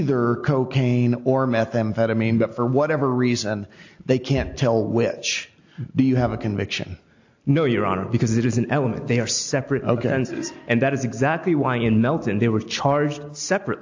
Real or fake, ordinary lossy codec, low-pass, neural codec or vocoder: real; AAC, 48 kbps; 7.2 kHz; none